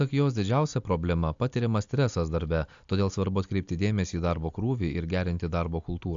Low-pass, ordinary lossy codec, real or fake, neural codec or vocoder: 7.2 kHz; AAC, 64 kbps; real; none